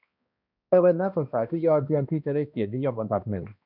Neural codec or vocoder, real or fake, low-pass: codec, 16 kHz, 1 kbps, X-Codec, HuBERT features, trained on balanced general audio; fake; 5.4 kHz